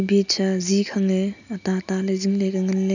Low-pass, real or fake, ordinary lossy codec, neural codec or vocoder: 7.2 kHz; real; none; none